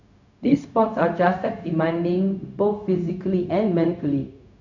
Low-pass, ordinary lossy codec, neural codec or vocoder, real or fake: 7.2 kHz; none; codec, 16 kHz, 0.4 kbps, LongCat-Audio-Codec; fake